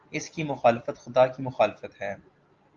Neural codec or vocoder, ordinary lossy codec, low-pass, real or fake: none; Opus, 32 kbps; 7.2 kHz; real